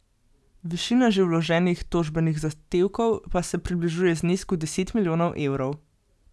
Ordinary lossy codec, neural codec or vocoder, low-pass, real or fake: none; none; none; real